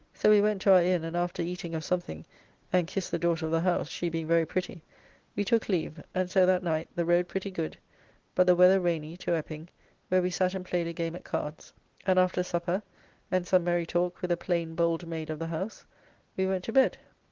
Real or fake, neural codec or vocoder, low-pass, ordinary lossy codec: real; none; 7.2 kHz; Opus, 16 kbps